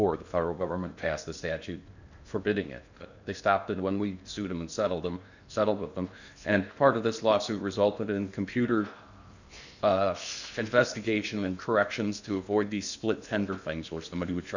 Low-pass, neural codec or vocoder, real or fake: 7.2 kHz; codec, 16 kHz in and 24 kHz out, 0.8 kbps, FocalCodec, streaming, 65536 codes; fake